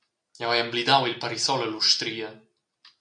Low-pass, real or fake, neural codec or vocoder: 9.9 kHz; real; none